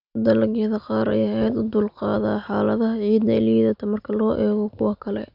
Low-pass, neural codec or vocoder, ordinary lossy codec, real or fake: 5.4 kHz; none; none; real